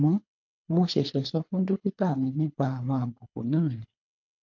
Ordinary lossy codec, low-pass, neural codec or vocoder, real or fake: MP3, 48 kbps; 7.2 kHz; codec, 24 kHz, 3 kbps, HILCodec; fake